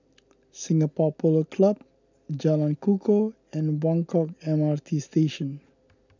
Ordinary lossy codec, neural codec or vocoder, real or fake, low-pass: none; none; real; 7.2 kHz